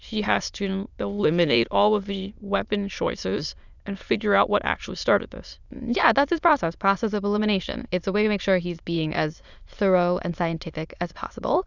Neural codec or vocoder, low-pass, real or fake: autoencoder, 22.05 kHz, a latent of 192 numbers a frame, VITS, trained on many speakers; 7.2 kHz; fake